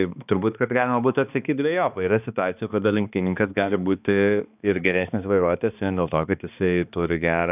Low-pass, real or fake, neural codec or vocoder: 3.6 kHz; fake; codec, 16 kHz, 2 kbps, X-Codec, HuBERT features, trained on balanced general audio